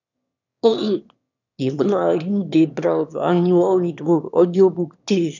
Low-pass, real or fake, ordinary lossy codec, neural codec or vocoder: 7.2 kHz; fake; none; autoencoder, 22.05 kHz, a latent of 192 numbers a frame, VITS, trained on one speaker